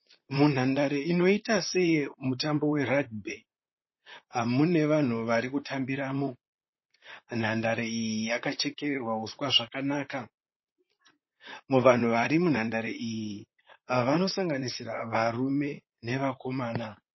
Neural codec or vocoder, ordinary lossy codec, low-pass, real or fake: vocoder, 44.1 kHz, 128 mel bands, Pupu-Vocoder; MP3, 24 kbps; 7.2 kHz; fake